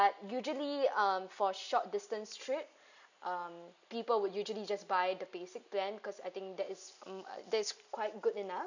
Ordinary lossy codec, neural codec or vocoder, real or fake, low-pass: none; none; real; 7.2 kHz